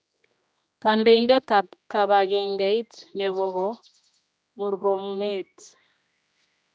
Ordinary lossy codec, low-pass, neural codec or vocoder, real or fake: none; none; codec, 16 kHz, 1 kbps, X-Codec, HuBERT features, trained on general audio; fake